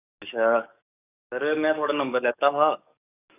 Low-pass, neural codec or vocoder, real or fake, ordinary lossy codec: 3.6 kHz; codec, 44.1 kHz, 7.8 kbps, DAC; fake; none